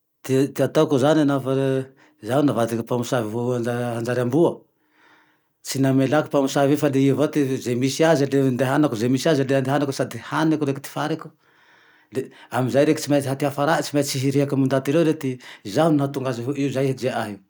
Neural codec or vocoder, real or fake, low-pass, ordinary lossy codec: none; real; none; none